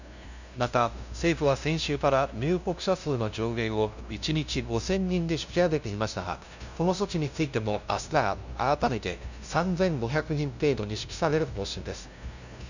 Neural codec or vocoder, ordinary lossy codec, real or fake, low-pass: codec, 16 kHz, 0.5 kbps, FunCodec, trained on LibriTTS, 25 frames a second; none; fake; 7.2 kHz